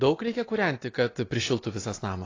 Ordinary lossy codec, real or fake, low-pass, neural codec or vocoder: AAC, 32 kbps; real; 7.2 kHz; none